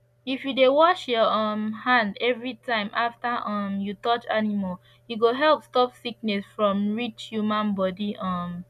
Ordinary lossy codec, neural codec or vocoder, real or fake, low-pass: none; none; real; 14.4 kHz